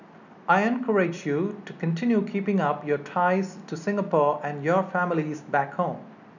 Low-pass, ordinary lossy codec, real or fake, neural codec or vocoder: 7.2 kHz; none; real; none